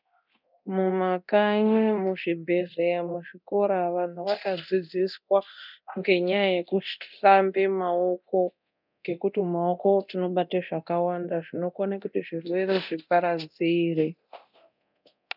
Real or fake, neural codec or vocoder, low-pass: fake; codec, 24 kHz, 0.9 kbps, DualCodec; 5.4 kHz